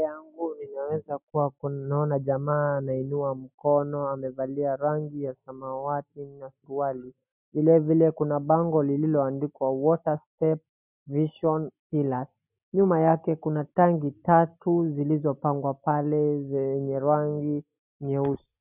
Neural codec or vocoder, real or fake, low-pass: none; real; 3.6 kHz